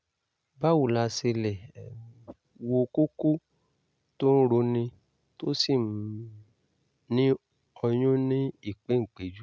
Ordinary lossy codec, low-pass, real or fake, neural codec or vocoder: none; none; real; none